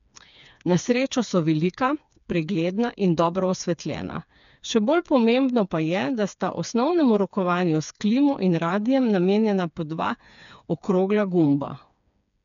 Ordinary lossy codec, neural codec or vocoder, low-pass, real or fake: none; codec, 16 kHz, 4 kbps, FreqCodec, smaller model; 7.2 kHz; fake